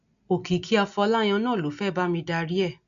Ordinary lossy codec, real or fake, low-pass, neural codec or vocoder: MP3, 96 kbps; real; 7.2 kHz; none